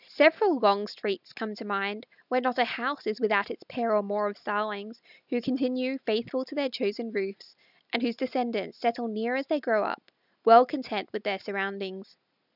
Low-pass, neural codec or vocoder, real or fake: 5.4 kHz; none; real